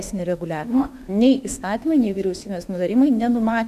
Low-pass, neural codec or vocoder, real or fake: 14.4 kHz; autoencoder, 48 kHz, 32 numbers a frame, DAC-VAE, trained on Japanese speech; fake